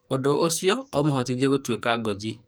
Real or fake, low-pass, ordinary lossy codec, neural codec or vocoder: fake; none; none; codec, 44.1 kHz, 2.6 kbps, SNAC